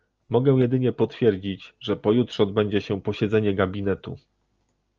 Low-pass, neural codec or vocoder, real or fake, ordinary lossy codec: 7.2 kHz; none; real; Opus, 24 kbps